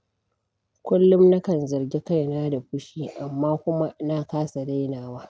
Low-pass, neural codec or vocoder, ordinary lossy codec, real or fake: none; none; none; real